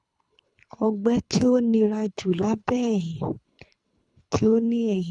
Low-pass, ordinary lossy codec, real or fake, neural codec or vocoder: 10.8 kHz; none; fake; codec, 24 kHz, 3 kbps, HILCodec